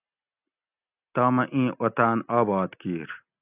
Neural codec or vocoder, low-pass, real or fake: none; 3.6 kHz; real